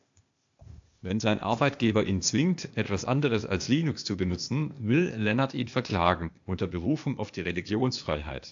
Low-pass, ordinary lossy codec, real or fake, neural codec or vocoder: 7.2 kHz; AAC, 64 kbps; fake; codec, 16 kHz, 0.8 kbps, ZipCodec